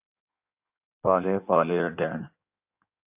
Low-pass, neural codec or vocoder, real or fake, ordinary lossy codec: 3.6 kHz; codec, 16 kHz in and 24 kHz out, 1.1 kbps, FireRedTTS-2 codec; fake; AAC, 32 kbps